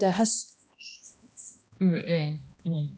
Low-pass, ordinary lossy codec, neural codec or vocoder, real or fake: none; none; codec, 16 kHz, 1 kbps, X-Codec, HuBERT features, trained on balanced general audio; fake